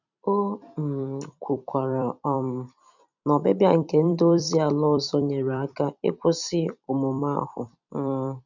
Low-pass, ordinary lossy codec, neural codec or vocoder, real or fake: 7.2 kHz; none; vocoder, 44.1 kHz, 128 mel bands every 256 samples, BigVGAN v2; fake